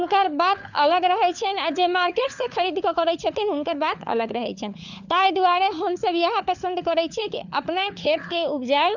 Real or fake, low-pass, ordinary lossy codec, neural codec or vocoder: fake; 7.2 kHz; none; codec, 16 kHz, 4 kbps, FunCodec, trained on LibriTTS, 50 frames a second